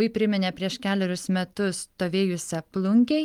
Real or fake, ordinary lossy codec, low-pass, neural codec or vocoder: real; Opus, 32 kbps; 19.8 kHz; none